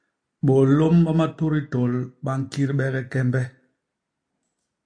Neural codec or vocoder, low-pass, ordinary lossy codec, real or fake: none; 9.9 kHz; AAC, 64 kbps; real